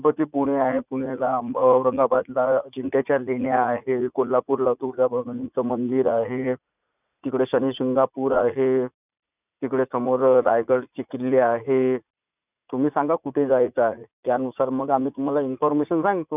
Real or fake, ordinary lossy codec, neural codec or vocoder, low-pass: fake; none; vocoder, 44.1 kHz, 80 mel bands, Vocos; 3.6 kHz